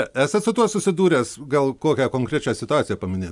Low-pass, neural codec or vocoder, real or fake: 10.8 kHz; none; real